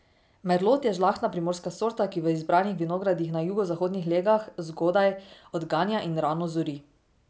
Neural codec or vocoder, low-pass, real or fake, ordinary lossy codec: none; none; real; none